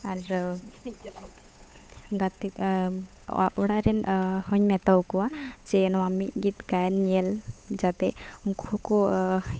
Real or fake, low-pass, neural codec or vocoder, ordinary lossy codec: fake; none; codec, 16 kHz, 8 kbps, FunCodec, trained on Chinese and English, 25 frames a second; none